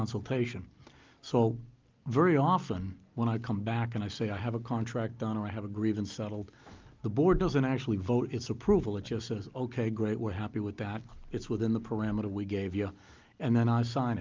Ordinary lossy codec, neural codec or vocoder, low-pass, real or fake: Opus, 24 kbps; none; 7.2 kHz; real